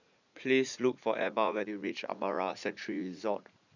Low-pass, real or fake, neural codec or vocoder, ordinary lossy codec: 7.2 kHz; fake; vocoder, 44.1 kHz, 80 mel bands, Vocos; none